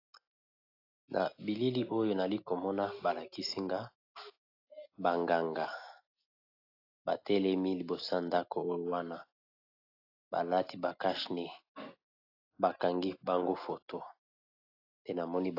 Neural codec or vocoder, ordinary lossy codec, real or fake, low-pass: none; AAC, 32 kbps; real; 5.4 kHz